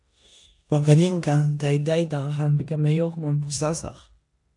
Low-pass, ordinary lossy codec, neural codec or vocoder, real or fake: 10.8 kHz; AAC, 64 kbps; codec, 16 kHz in and 24 kHz out, 0.9 kbps, LongCat-Audio-Codec, four codebook decoder; fake